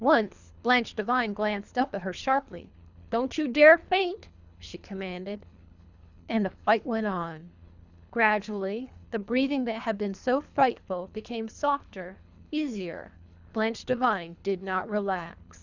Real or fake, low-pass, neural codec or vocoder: fake; 7.2 kHz; codec, 24 kHz, 3 kbps, HILCodec